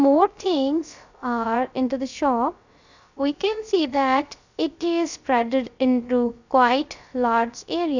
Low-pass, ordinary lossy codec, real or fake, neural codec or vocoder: 7.2 kHz; none; fake; codec, 16 kHz, 0.3 kbps, FocalCodec